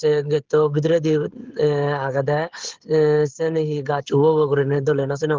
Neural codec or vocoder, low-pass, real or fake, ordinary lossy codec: codec, 16 kHz, 16 kbps, FreqCodec, smaller model; 7.2 kHz; fake; Opus, 16 kbps